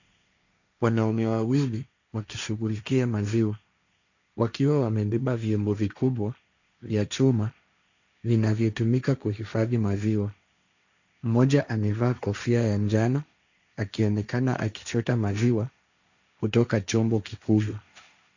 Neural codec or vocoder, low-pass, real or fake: codec, 16 kHz, 1.1 kbps, Voila-Tokenizer; 7.2 kHz; fake